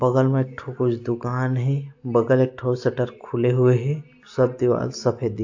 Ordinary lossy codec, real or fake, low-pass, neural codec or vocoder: AAC, 48 kbps; real; 7.2 kHz; none